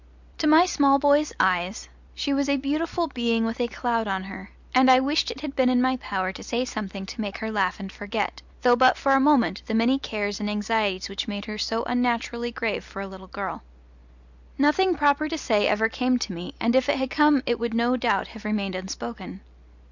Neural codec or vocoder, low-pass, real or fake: none; 7.2 kHz; real